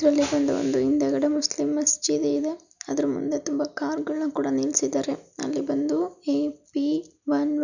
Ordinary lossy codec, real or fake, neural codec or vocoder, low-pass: none; real; none; 7.2 kHz